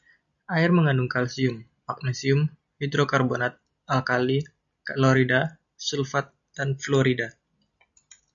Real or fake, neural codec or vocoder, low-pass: real; none; 7.2 kHz